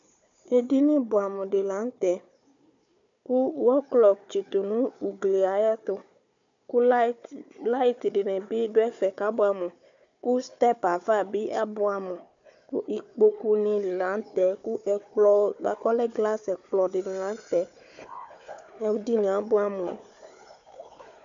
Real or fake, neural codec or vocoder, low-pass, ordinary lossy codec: fake; codec, 16 kHz, 4 kbps, FunCodec, trained on Chinese and English, 50 frames a second; 7.2 kHz; MP3, 64 kbps